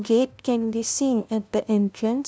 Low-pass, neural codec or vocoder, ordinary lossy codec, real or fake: none; codec, 16 kHz, 0.5 kbps, FunCodec, trained on LibriTTS, 25 frames a second; none; fake